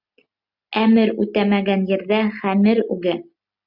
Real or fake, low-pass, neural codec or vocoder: real; 5.4 kHz; none